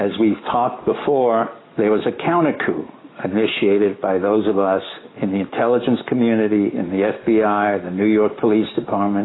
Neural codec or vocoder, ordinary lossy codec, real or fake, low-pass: none; AAC, 16 kbps; real; 7.2 kHz